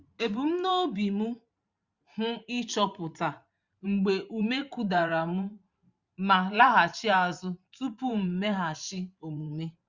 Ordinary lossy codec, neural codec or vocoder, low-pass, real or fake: Opus, 64 kbps; vocoder, 44.1 kHz, 128 mel bands every 512 samples, BigVGAN v2; 7.2 kHz; fake